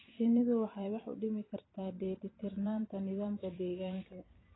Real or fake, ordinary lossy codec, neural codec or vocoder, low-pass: fake; AAC, 16 kbps; vocoder, 44.1 kHz, 128 mel bands every 256 samples, BigVGAN v2; 7.2 kHz